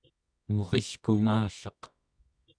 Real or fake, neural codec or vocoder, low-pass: fake; codec, 24 kHz, 0.9 kbps, WavTokenizer, medium music audio release; 9.9 kHz